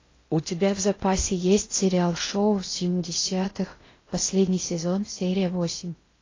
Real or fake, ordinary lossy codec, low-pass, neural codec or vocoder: fake; AAC, 32 kbps; 7.2 kHz; codec, 16 kHz in and 24 kHz out, 0.6 kbps, FocalCodec, streaming, 2048 codes